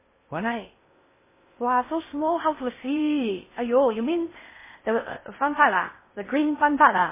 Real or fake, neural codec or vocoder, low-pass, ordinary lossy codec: fake; codec, 16 kHz in and 24 kHz out, 0.6 kbps, FocalCodec, streaming, 2048 codes; 3.6 kHz; MP3, 16 kbps